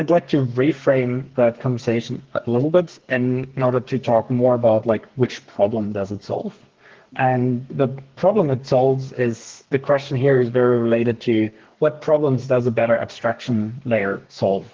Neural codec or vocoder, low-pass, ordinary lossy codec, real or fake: codec, 32 kHz, 1.9 kbps, SNAC; 7.2 kHz; Opus, 16 kbps; fake